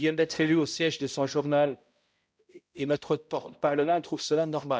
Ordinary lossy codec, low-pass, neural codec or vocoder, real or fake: none; none; codec, 16 kHz, 0.5 kbps, X-Codec, HuBERT features, trained on balanced general audio; fake